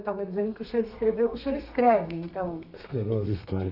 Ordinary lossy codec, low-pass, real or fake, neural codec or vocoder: none; 5.4 kHz; fake; codec, 32 kHz, 1.9 kbps, SNAC